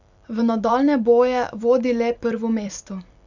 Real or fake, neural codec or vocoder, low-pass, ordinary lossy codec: fake; vocoder, 44.1 kHz, 128 mel bands every 512 samples, BigVGAN v2; 7.2 kHz; none